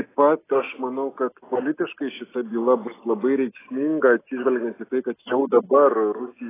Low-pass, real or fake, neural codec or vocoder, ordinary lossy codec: 3.6 kHz; real; none; AAC, 16 kbps